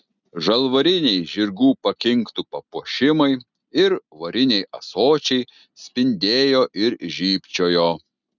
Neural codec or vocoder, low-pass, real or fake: none; 7.2 kHz; real